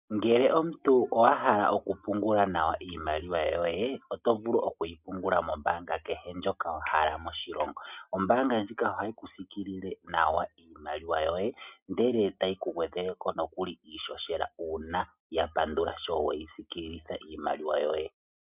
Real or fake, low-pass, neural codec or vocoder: real; 3.6 kHz; none